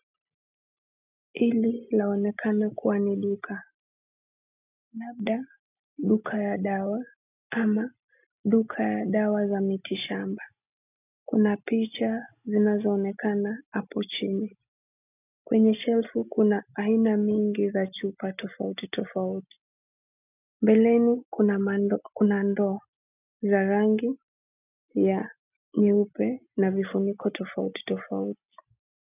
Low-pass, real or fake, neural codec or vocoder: 3.6 kHz; real; none